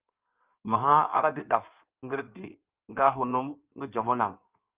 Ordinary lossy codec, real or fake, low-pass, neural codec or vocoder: Opus, 24 kbps; fake; 3.6 kHz; codec, 16 kHz in and 24 kHz out, 1.1 kbps, FireRedTTS-2 codec